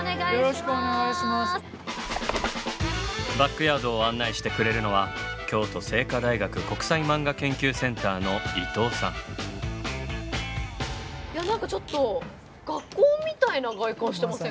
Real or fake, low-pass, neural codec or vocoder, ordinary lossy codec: real; none; none; none